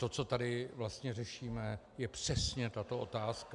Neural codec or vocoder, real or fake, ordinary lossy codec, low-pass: none; real; MP3, 96 kbps; 10.8 kHz